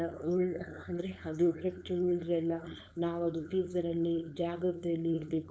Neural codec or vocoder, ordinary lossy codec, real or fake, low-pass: codec, 16 kHz, 4.8 kbps, FACodec; none; fake; none